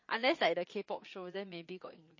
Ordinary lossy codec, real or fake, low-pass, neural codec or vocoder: MP3, 32 kbps; fake; 7.2 kHz; vocoder, 22.05 kHz, 80 mel bands, Vocos